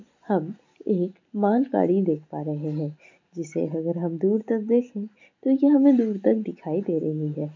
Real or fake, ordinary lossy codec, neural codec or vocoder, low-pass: real; MP3, 64 kbps; none; 7.2 kHz